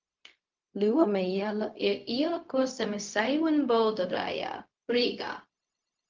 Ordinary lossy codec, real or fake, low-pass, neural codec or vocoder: Opus, 24 kbps; fake; 7.2 kHz; codec, 16 kHz, 0.4 kbps, LongCat-Audio-Codec